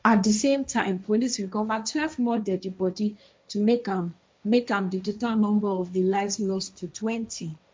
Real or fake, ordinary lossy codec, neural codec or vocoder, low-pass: fake; none; codec, 16 kHz, 1.1 kbps, Voila-Tokenizer; none